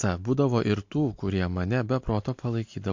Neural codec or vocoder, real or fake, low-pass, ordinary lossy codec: none; real; 7.2 kHz; MP3, 48 kbps